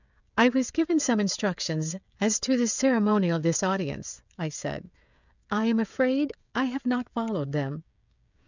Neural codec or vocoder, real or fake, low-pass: codec, 16 kHz, 16 kbps, FreqCodec, smaller model; fake; 7.2 kHz